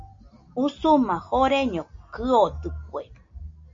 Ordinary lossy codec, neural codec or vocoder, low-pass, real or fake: MP3, 48 kbps; none; 7.2 kHz; real